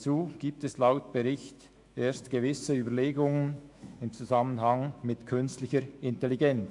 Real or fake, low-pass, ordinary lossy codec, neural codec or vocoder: fake; 10.8 kHz; AAC, 64 kbps; autoencoder, 48 kHz, 128 numbers a frame, DAC-VAE, trained on Japanese speech